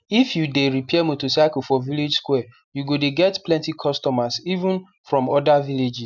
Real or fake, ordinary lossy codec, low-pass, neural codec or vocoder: real; none; 7.2 kHz; none